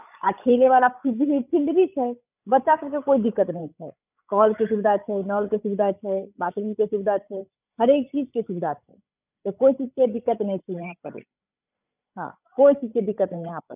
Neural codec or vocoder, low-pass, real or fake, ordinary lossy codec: codec, 16 kHz, 16 kbps, FreqCodec, larger model; 3.6 kHz; fake; none